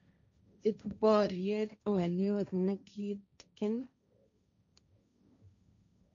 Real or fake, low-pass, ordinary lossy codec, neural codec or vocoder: fake; 7.2 kHz; none; codec, 16 kHz, 1.1 kbps, Voila-Tokenizer